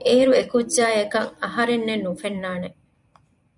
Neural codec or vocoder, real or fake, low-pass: vocoder, 44.1 kHz, 128 mel bands every 256 samples, BigVGAN v2; fake; 10.8 kHz